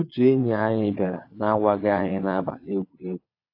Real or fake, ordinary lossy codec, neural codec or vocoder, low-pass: fake; AAC, 32 kbps; vocoder, 22.05 kHz, 80 mel bands, Vocos; 5.4 kHz